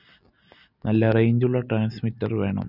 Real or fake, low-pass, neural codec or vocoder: real; 5.4 kHz; none